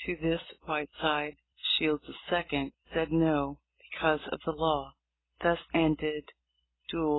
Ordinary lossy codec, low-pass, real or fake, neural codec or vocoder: AAC, 16 kbps; 7.2 kHz; real; none